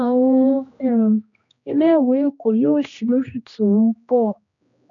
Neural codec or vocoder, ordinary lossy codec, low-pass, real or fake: codec, 16 kHz, 1 kbps, X-Codec, HuBERT features, trained on general audio; none; 7.2 kHz; fake